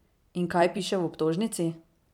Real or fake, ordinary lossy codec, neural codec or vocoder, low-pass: fake; none; vocoder, 44.1 kHz, 128 mel bands every 256 samples, BigVGAN v2; 19.8 kHz